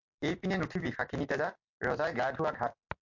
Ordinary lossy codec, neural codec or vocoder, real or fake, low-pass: MP3, 48 kbps; none; real; 7.2 kHz